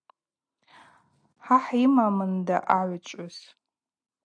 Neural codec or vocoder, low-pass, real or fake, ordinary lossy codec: none; 9.9 kHz; real; AAC, 64 kbps